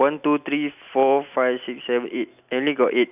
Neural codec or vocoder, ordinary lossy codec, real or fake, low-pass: none; none; real; 3.6 kHz